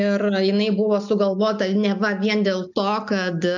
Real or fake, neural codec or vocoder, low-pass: real; none; 7.2 kHz